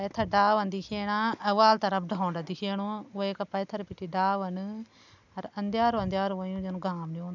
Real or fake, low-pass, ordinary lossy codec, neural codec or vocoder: real; 7.2 kHz; none; none